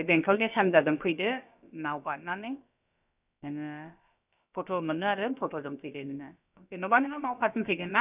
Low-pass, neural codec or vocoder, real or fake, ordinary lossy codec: 3.6 kHz; codec, 16 kHz, about 1 kbps, DyCAST, with the encoder's durations; fake; none